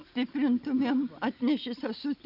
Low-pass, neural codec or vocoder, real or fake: 5.4 kHz; none; real